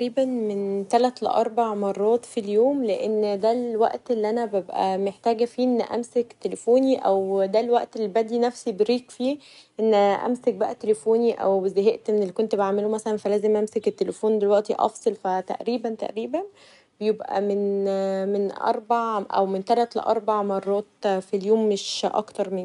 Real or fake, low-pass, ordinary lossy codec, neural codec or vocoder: real; 10.8 kHz; MP3, 96 kbps; none